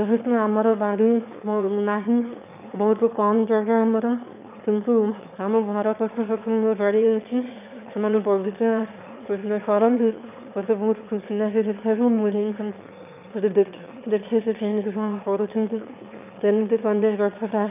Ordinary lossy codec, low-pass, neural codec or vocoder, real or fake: none; 3.6 kHz; autoencoder, 22.05 kHz, a latent of 192 numbers a frame, VITS, trained on one speaker; fake